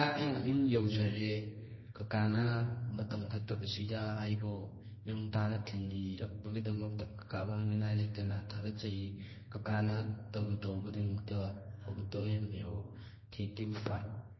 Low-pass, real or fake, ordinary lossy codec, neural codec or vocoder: 7.2 kHz; fake; MP3, 24 kbps; codec, 24 kHz, 0.9 kbps, WavTokenizer, medium music audio release